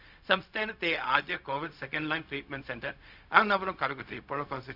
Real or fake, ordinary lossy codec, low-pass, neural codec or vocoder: fake; none; 5.4 kHz; codec, 16 kHz, 0.4 kbps, LongCat-Audio-Codec